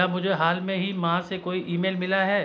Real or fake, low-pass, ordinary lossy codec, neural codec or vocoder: real; none; none; none